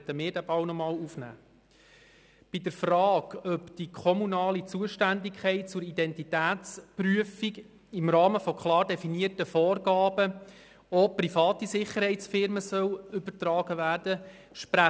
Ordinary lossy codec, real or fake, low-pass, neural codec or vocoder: none; real; none; none